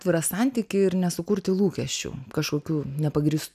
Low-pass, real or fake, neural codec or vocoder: 14.4 kHz; real; none